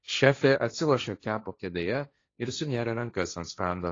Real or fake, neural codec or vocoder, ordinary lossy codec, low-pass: fake; codec, 16 kHz, 1.1 kbps, Voila-Tokenizer; AAC, 32 kbps; 7.2 kHz